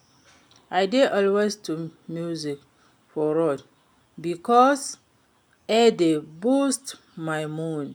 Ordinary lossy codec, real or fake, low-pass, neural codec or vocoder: none; real; 19.8 kHz; none